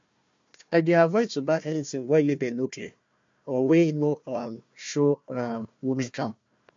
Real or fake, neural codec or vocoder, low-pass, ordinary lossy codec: fake; codec, 16 kHz, 1 kbps, FunCodec, trained on Chinese and English, 50 frames a second; 7.2 kHz; MP3, 48 kbps